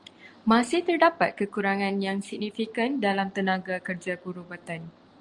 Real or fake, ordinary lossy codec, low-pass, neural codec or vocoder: real; Opus, 32 kbps; 10.8 kHz; none